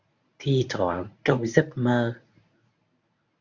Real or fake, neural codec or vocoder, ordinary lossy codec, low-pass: fake; codec, 24 kHz, 0.9 kbps, WavTokenizer, medium speech release version 1; Opus, 64 kbps; 7.2 kHz